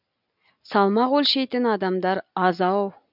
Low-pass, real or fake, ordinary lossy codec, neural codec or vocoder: 5.4 kHz; real; none; none